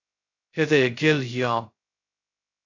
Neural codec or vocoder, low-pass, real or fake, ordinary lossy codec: codec, 16 kHz, 0.2 kbps, FocalCodec; 7.2 kHz; fake; AAC, 48 kbps